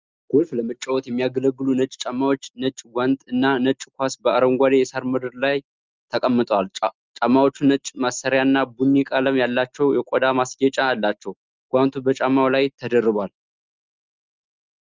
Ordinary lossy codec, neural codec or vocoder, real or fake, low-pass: Opus, 32 kbps; none; real; 7.2 kHz